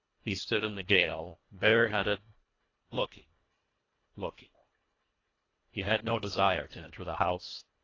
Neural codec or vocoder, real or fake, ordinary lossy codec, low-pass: codec, 24 kHz, 1.5 kbps, HILCodec; fake; AAC, 32 kbps; 7.2 kHz